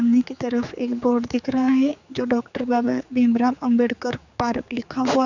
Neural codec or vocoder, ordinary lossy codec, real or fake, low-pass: codec, 16 kHz, 4 kbps, X-Codec, HuBERT features, trained on general audio; none; fake; 7.2 kHz